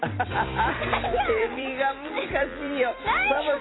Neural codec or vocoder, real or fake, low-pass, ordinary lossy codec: vocoder, 44.1 kHz, 128 mel bands every 256 samples, BigVGAN v2; fake; 7.2 kHz; AAC, 16 kbps